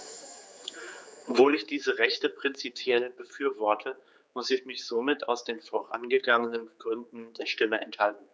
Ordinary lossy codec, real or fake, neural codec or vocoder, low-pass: none; fake; codec, 16 kHz, 4 kbps, X-Codec, HuBERT features, trained on general audio; none